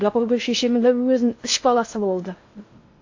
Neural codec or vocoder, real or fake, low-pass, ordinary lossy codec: codec, 16 kHz in and 24 kHz out, 0.6 kbps, FocalCodec, streaming, 4096 codes; fake; 7.2 kHz; AAC, 48 kbps